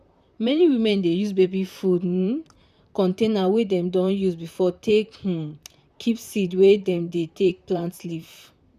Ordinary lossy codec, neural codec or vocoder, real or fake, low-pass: none; vocoder, 44.1 kHz, 128 mel bands, Pupu-Vocoder; fake; 14.4 kHz